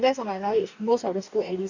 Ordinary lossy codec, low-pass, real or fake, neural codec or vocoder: none; 7.2 kHz; fake; codec, 44.1 kHz, 2.6 kbps, DAC